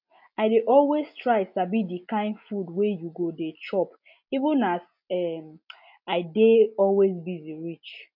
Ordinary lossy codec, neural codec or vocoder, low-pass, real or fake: MP3, 48 kbps; none; 5.4 kHz; real